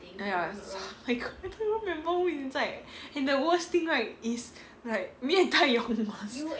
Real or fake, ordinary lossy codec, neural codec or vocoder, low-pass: real; none; none; none